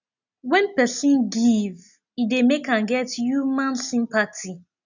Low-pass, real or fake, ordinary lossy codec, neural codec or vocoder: 7.2 kHz; real; none; none